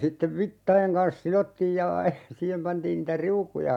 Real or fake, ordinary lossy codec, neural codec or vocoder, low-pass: real; none; none; 19.8 kHz